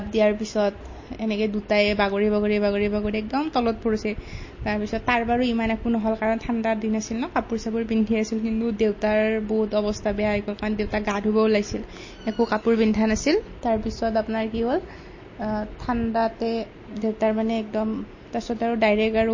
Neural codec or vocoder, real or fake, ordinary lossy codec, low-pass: none; real; MP3, 32 kbps; 7.2 kHz